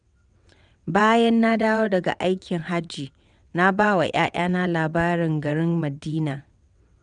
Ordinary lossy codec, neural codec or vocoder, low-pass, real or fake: none; vocoder, 22.05 kHz, 80 mel bands, WaveNeXt; 9.9 kHz; fake